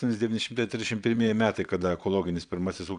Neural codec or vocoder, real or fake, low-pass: vocoder, 22.05 kHz, 80 mel bands, Vocos; fake; 9.9 kHz